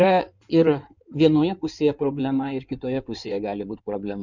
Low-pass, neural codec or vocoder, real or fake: 7.2 kHz; codec, 16 kHz in and 24 kHz out, 2.2 kbps, FireRedTTS-2 codec; fake